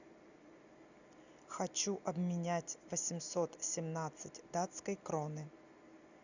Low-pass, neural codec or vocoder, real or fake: 7.2 kHz; none; real